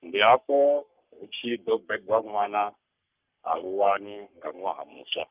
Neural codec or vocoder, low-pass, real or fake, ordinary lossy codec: codec, 44.1 kHz, 3.4 kbps, Pupu-Codec; 3.6 kHz; fake; Opus, 24 kbps